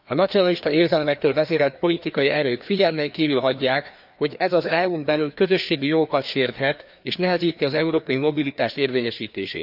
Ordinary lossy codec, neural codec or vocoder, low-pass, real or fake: none; codec, 16 kHz, 2 kbps, FreqCodec, larger model; 5.4 kHz; fake